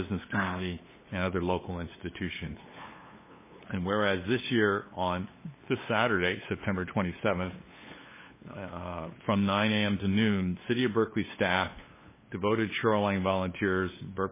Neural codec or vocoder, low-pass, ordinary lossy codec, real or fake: codec, 16 kHz, 8 kbps, FunCodec, trained on LibriTTS, 25 frames a second; 3.6 kHz; MP3, 16 kbps; fake